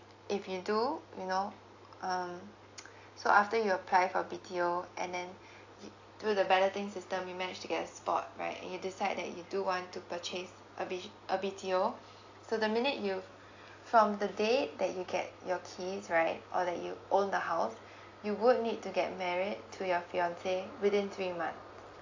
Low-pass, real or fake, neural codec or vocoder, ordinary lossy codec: 7.2 kHz; real; none; AAC, 48 kbps